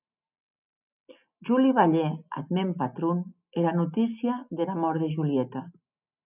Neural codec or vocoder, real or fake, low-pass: none; real; 3.6 kHz